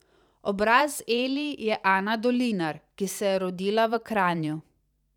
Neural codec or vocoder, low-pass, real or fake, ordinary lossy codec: vocoder, 44.1 kHz, 128 mel bands every 512 samples, BigVGAN v2; 19.8 kHz; fake; none